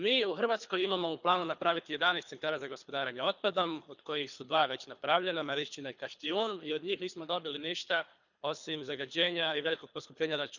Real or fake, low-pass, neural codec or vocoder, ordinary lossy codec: fake; 7.2 kHz; codec, 24 kHz, 3 kbps, HILCodec; none